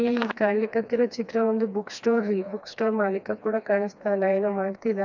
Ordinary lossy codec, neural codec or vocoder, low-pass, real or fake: none; codec, 16 kHz, 2 kbps, FreqCodec, smaller model; 7.2 kHz; fake